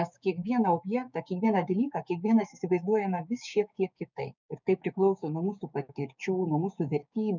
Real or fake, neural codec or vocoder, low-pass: fake; codec, 16 kHz, 6 kbps, DAC; 7.2 kHz